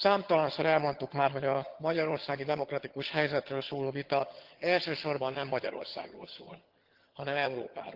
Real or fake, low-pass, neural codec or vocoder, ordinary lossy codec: fake; 5.4 kHz; vocoder, 22.05 kHz, 80 mel bands, HiFi-GAN; Opus, 16 kbps